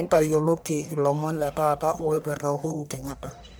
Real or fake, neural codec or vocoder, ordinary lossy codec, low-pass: fake; codec, 44.1 kHz, 1.7 kbps, Pupu-Codec; none; none